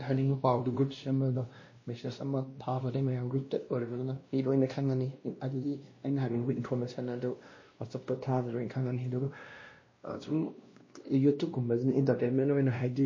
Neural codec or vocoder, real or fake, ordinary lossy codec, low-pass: codec, 16 kHz, 1 kbps, X-Codec, WavLM features, trained on Multilingual LibriSpeech; fake; MP3, 32 kbps; 7.2 kHz